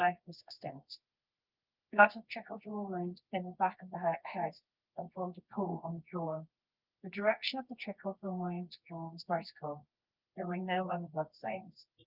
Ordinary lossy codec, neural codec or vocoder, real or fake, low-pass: Opus, 24 kbps; codec, 24 kHz, 0.9 kbps, WavTokenizer, medium music audio release; fake; 5.4 kHz